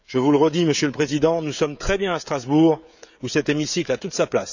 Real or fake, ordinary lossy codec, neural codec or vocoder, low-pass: fake; none; codec, 16 kHz, 16 kbps, FreqCodec, smaller model; 7.2 kHz